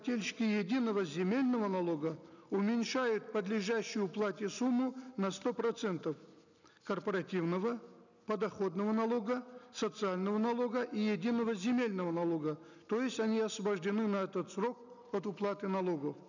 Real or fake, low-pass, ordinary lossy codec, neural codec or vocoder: real; 7.2 kHz; none; none